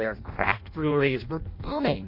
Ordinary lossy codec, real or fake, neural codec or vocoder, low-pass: MP3, 32 kbps; fake; codec, 16 kHz in and 24 kHz out, 0.6 kbps, FireRedTTS-2 codec; 5.4 kHz